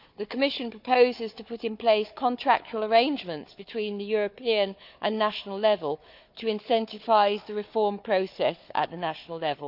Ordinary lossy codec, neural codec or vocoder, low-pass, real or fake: none; codec, 16 kHz, 4 kbps, FunCodec, trained on Chinese and English, 50 frames a second; 5.4 kHz; fake